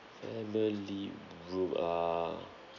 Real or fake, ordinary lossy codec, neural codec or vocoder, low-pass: real; none; none; 7.2 kHz